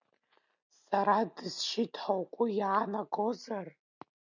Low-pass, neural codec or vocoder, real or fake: 7.2 kHz; none; real